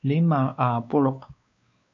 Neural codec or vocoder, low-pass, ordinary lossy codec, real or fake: codec, 16 kHz, 2 kbps, X-Codec, WavLM features, trained on Multilingual LibriSpeech; 7.2 kHz; AAC, 64 kbps; fake